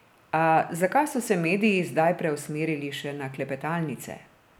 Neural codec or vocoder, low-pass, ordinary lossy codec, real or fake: none; none; none; real